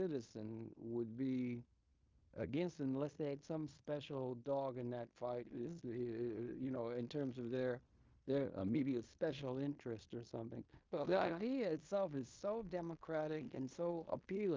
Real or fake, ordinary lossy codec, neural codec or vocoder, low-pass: fake; Opus, 24 kbps; codec, 16 kHz in and 24 kHz out, 0.9 kbps, LongCat-Audio-Codec, fine tuned four codebook decoder; 7.2 kHz